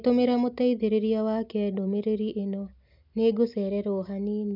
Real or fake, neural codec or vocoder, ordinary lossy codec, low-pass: real; none; none; 5.4 kHz